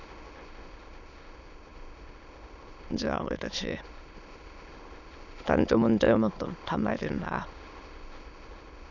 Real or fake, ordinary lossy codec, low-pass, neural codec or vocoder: fake; none; 7.2 kHz; autoencoder, 22.05 kHz, a latent of 192 numbers a frame, VITS, trained on many speakers